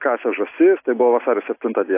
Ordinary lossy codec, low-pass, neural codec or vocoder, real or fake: AAC, 24 kbps; 3.6 kHz; none; real